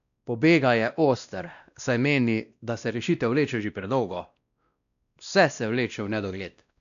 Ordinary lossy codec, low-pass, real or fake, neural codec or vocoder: none; 7.2 kHz; fake; codec, 16 kHz, 1 kbps, X-Codec, WavLM features, trained on Multilingual LibriSpeech